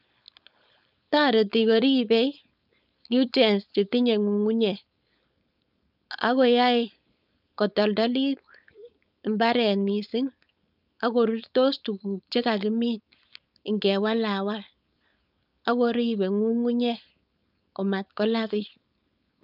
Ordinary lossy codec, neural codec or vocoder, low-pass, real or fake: none; codec, 16 kHz, 4.8 kbps, FACodec; 5.4 kHz; fake